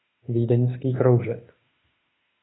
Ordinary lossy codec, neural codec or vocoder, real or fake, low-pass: AAC, 16 kbps; autoencoder, 48 kHz, 32 numbers a frame, DAC-VAE, trained on Japanese speech; fake; 7.2 kHz